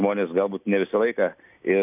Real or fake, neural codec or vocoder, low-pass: real; none; 3.6 kHz